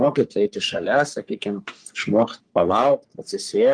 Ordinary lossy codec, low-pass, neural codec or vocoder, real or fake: Opus, 32 kbps; 9.9 kHz; codec, 44.1 kHz, 3.4 kbps, Pupu-Codec; fake